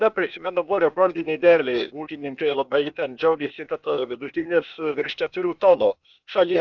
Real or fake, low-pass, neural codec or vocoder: fake; 7.2 kHz; codec, 16 kHz, 0.8 kbps, ZipCodec